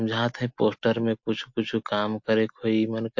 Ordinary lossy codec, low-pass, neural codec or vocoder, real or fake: MP3, 48 kbps; 7.2 kHz; none; real